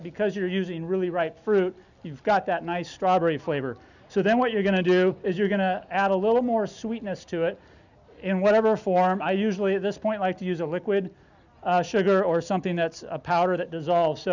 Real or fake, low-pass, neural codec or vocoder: real; 7.2 kHz; none